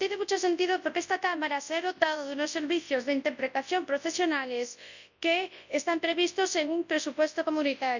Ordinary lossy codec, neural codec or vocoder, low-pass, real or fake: none; codec, 24 kHz, 0.9 kbps, WavTokenizer, large speech release; 7.2 kHz; fake